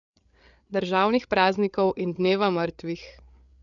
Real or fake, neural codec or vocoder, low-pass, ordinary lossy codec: fake; codec, 16 kHz, 8 kbps, FreqCodec, larger model; 7.2 kHz; MP3, 96 kbps